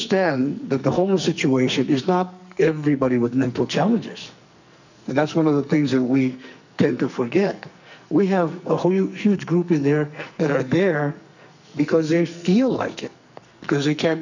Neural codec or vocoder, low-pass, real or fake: codec, 44.1 kHz, 2.6 kbps, SNAC; 7.2 kHz; fake